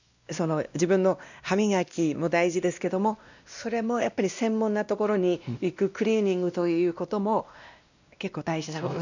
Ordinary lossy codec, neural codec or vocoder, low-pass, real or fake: none; codec, 16 kHz, 1 kbps, X-Codec, WavLM features, trained on Multilingual LibriSpeech; 7.2 kHz; fake